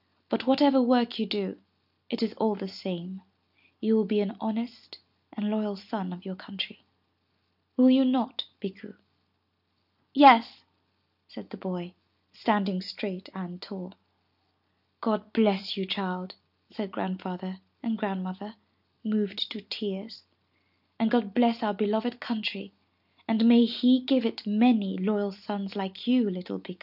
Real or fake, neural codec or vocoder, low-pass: real; none; 5.4 kHz